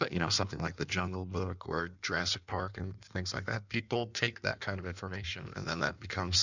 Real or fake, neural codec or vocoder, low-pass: fake; codec, 16 kHz in and 24 kHz out, 1.1 kbps, FireRedTTS-2 codec; 7.2 kHz